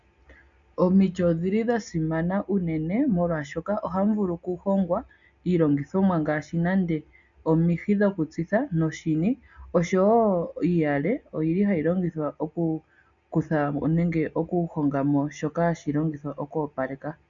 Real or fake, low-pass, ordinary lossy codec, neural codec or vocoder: real; 7.2 kHz; MP3, 96 kbps; none